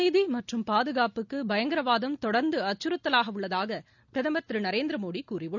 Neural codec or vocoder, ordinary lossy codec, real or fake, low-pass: none; none; real; 7.2 kHz